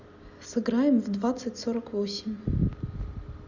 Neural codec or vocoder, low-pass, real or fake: none; 7.2 kHz; real